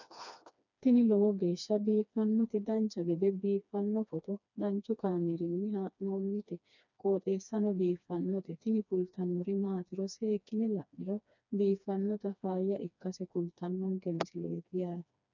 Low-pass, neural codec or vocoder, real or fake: 7.2 kHz; codec, 16 kHz, 2 kbps, FreqCodec, smaller model; fake